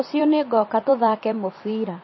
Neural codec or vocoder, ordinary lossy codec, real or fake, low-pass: vocoder, 44.1 kHz, 128 mel bands every 256 samples, BigVGAN v2; MP3, 24 kbps; fake; 7.2 kHz